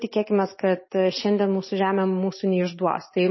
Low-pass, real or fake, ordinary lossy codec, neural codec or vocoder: 7.2 kHz; real; MP3, 24 kbps; none